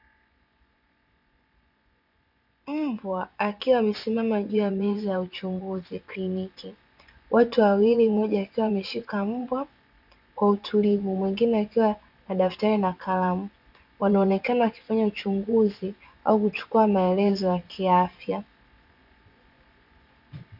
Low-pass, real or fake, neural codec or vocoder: 5.4 kHz; fake; vocoder, 24 kHz, 100 mel bands, Vocos